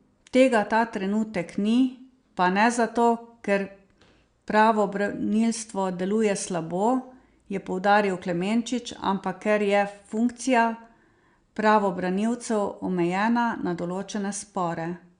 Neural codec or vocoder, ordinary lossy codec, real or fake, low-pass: none; Opus, 64 kbps; real; 9.9 kHz